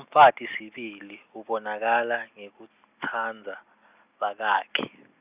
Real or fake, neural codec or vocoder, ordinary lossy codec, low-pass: real; none; Opus, 64 kbps; 3.6 kHz